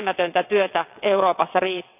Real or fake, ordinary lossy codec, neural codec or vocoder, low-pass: fake; none; vocoder, 22.05 kHz, 80 mel bands, WaveNeXt; 3.6 kHz